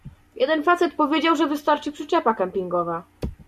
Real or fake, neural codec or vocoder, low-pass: real; none; 14.4 kHz